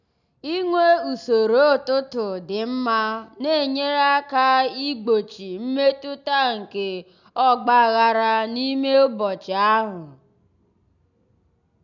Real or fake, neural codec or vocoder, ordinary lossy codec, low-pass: real; none; none; 7.2 kHz